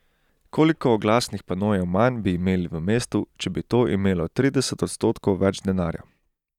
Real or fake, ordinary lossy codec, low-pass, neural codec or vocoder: real; none; 19.8 kHz; none